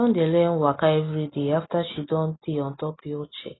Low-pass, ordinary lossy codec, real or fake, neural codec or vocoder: 7.2 kHz; AAC, 16 kbps; real; none